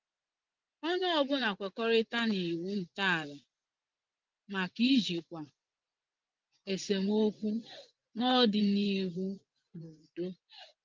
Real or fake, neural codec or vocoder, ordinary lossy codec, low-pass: fake; vocoder, 24 kHz, 100 mel bands, Vocos; Opus, 32 kbps; 7.2 kHz